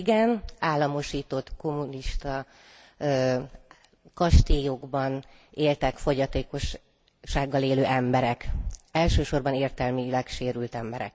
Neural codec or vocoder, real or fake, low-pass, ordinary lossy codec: none; real; none; none